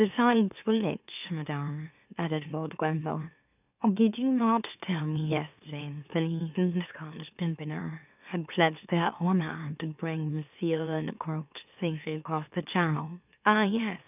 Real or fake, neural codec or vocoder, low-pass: fake; autoencoder, 44.1 kHz, a latent of 192 numbers a frame, MeloTTS; 3.6 kHz